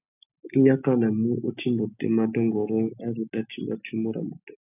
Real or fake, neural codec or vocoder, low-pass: real; none; 3.6 kHz